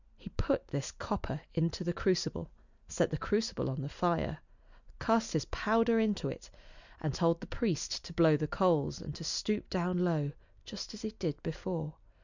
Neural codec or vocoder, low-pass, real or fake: none; 7.2 kHz; real